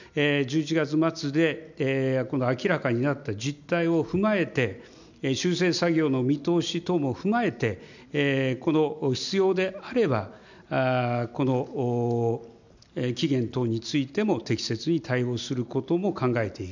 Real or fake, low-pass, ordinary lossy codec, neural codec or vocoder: real; 7.2 kHz; none; none